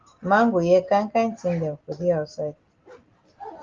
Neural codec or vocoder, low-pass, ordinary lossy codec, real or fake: none; 7.2 kHz; Opus, 32 kbps; real